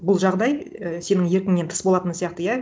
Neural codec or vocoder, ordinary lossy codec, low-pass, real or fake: none; none; none; real